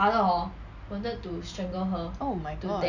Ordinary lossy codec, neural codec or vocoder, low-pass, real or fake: none; none; 7.2 kHz; real